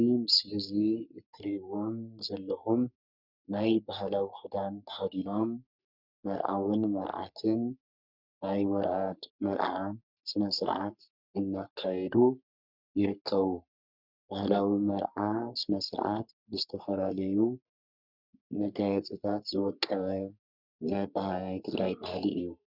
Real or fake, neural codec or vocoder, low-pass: fake; codec, 44.1 kHz, 3.4 kbps, Pupu-Codec; 5.4 kHz